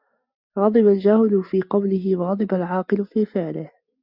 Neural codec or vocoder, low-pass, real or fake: none; 5.4 kHz; real